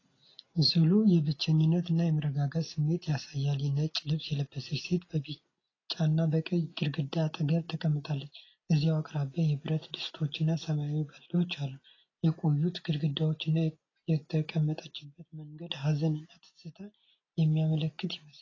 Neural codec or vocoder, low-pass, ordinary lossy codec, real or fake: none; 7.2 kHz; AAC, 32 kbps; real